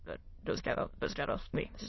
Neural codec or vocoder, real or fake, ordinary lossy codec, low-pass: autoencoder, 22.05 kHz, a latent of 192 numbers a frame, VITS, trained on many speakers; fake; MP3, 24 kbps; 7.2 kHz